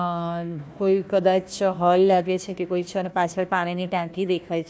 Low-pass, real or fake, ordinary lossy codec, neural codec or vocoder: none; fake; none; codec, 16 kHz, 1 kbps, FunCodec, trained on Chinese and English, 50 frames a second